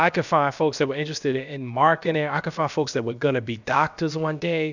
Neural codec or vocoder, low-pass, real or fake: codec, 16 kHz, about 1 kbps, DyCAST, with the encoder's durations; 7.2 kHz; fake